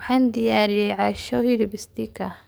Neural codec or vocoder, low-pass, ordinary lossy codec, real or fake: codec, 44.1 kHz, 7.8 kbps, DAC; none; none; fake